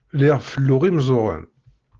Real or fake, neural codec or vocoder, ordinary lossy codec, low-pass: real; none; Opus, 16 kbps; 7.2 kHz